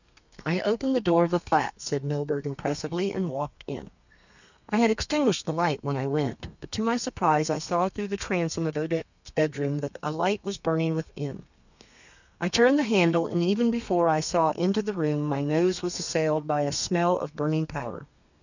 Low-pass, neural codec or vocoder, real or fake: 7.2 kHz; codec, 32 kHz, 1.9 kbps, SNAC; fake